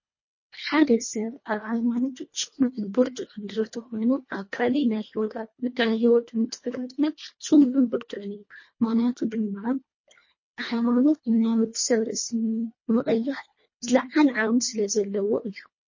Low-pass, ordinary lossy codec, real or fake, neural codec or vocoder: 7.2 kHz; MP3, 32 kbps; fake; codec, 24 kHz, 1.5 kbps, HILCodec